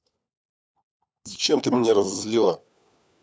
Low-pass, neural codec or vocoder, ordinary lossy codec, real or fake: none; codec, 16 kHz, 4 kbps, FunCodec, trained on LibriTTS, 50 frames a second; none; fake